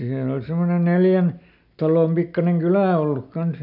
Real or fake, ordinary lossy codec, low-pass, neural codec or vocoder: real; none; 5.4 kHz; none